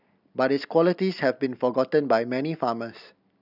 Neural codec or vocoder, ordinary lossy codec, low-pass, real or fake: none; none; 5.4 kHz; real